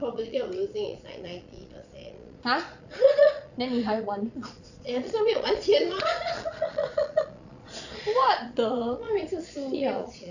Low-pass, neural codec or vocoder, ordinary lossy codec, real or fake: 7.2 kHz; vocoder, 22.05 kHz, 80 mel bands, Vocos; none; fake